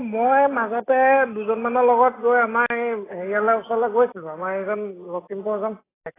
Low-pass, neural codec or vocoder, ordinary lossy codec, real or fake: 3.6 kHz; none; AAC, 16 kbps; real